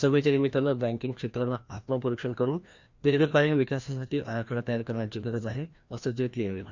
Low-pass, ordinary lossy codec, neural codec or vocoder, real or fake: 7.2 kHz; Opus, 64 kbps; codec, 16 kHz, 1 kbps, FreqCodec, larger model; fake